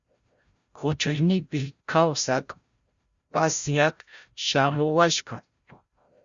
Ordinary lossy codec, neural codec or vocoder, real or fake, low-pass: Opus, 64 kbps; codec, 16 kHz, 0.5 kbps, FreqCodec, larger model; fake; 7.2 kHz